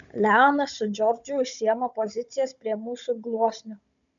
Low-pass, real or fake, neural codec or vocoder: 7.2 kHz; fake; codec, 16 kHz, 16 kbps, FunCodec, trained on Chinese and English, 50 frames a second